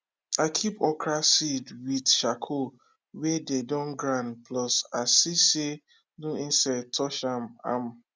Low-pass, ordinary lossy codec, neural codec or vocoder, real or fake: none; none; none; real